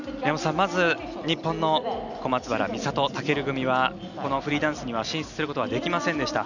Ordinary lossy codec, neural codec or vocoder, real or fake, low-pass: none; none; real; 7.2 kHz